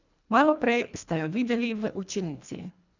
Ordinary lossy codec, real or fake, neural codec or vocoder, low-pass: none; fake; codec, 24 kHz, 1.5 kbps, HILCodec; 7.2 kHz